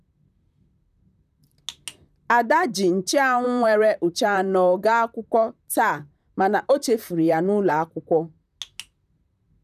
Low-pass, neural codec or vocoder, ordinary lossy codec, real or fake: 14.4 kHz; vocoder, 44.1 kHz, 128 mel bands, Pupu-Vocoder; none; fake